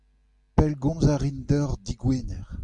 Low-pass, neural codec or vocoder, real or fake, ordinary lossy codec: 10.8 kHz; none; real; Opus, 64 kbps